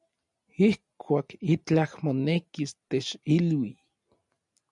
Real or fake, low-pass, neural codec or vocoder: real; 10.8 kHz; none